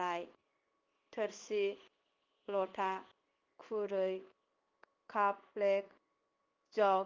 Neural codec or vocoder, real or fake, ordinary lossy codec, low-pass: codec, 16 kHz in and 24 kHz out, 1 kbps, XY-Tokenizer; fake; Opus, 32 kbps; 7.2 kHz